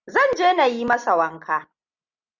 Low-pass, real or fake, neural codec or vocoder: 7.2 kHz; real; none